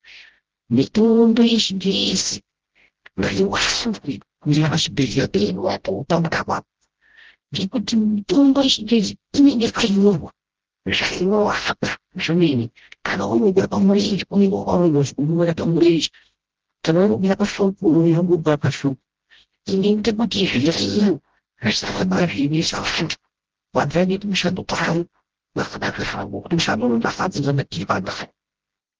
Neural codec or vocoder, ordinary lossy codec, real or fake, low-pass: codec, 16 kHz, 0.5 kbps, FreqCodec, smaller model; Opus, 16 kbps; fake; 7.2 kHz